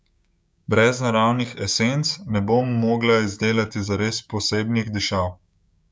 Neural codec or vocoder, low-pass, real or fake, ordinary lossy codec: codec, 16 kHz, 6 kbps, DAC; none; fake; none